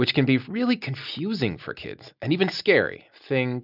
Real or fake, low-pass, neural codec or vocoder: real; 5.4 kHz; none